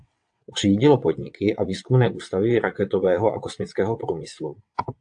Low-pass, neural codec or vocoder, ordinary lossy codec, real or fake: 9.9 kHz; vocoder, 22.05 kHz, 80 mel bands, WaveNeXt; AAC, 64 kbps; fake